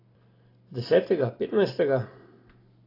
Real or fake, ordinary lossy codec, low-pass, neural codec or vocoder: real; AAC, 24 kbps; 5.4 kHz; none